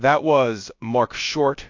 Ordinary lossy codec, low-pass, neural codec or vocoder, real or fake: MP3, 48 kbps; 7.2 kHz; codec, 16 kHz, about 1 kbps, DyCAST, with the encoder's durations; fake